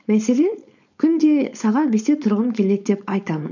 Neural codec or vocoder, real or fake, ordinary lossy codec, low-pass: codec, 16 kHz, 4.8 kbps, FACodec; fake; none; 7.2 kHz